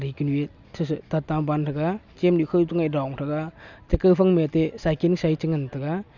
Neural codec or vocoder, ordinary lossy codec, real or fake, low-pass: none; none; real; 7.2 kHz